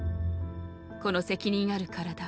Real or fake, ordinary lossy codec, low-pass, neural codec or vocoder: real; none; none; none